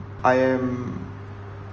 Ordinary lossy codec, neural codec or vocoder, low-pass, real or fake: Opus, 24 kbps; none; 7.2 kHz; real